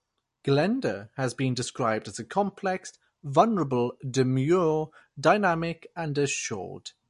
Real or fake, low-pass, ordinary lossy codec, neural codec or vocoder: real; 14.4 kHz; MP3, 48 kbps; none